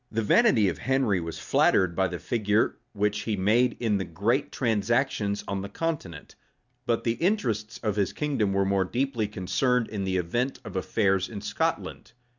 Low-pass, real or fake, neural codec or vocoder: 7.2 kHz; real; none